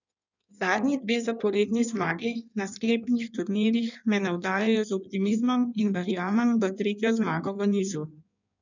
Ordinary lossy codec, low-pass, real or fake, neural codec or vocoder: none; 7.2 kHz; fake; codec, 16 kHz in and 24 kHz out, 1.1 kbps, FireRedTTS-2 codec